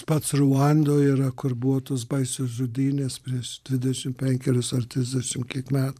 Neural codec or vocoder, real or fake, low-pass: vocoder, 44.1 kHz, 128 mel bands every 512 samples, BigVGAN v2; fake; 14.4 kHz